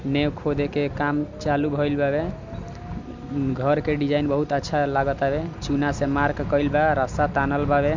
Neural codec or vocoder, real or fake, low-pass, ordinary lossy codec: none; real; 7.2 kHz; MP3, 64 kbps